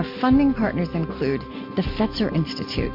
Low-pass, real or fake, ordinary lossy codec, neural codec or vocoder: 5.4 kHz; real; MP3, 32 kbps; none